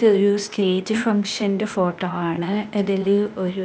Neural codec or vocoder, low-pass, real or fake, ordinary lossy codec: codec, 16 kHz, 0.8 kbps, ZipCodec; none; fake; none